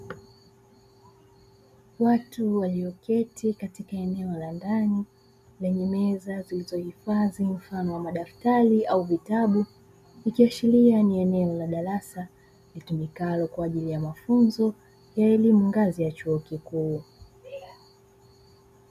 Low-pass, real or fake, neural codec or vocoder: 14.4 kHz; real; none